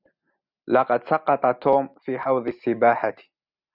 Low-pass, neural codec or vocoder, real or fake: 5.4 kHz; none; real